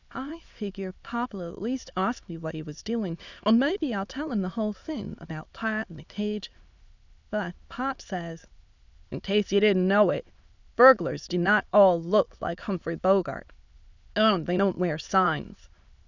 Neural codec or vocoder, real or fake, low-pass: autoencoder, 22.05 kHz, a latent of 192 numbers a frame, VITS, trained on many speakers; fake; 7.2 kHz